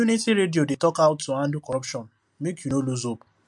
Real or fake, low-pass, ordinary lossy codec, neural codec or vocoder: real; 10.8 kHz; MP3, 64 kbps; none